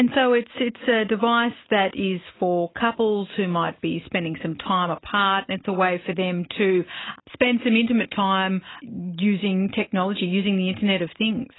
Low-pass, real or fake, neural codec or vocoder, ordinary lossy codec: 7.2 kHz; real; none; AAC, 16 kbps